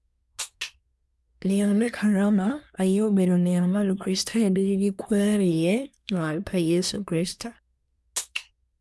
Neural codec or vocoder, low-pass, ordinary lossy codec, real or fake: codec, 24 kHz, 1 kbps, SNAC; none; none; fake